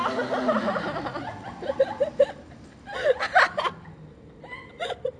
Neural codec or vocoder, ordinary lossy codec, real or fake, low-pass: none; none; real; 9.9 kHz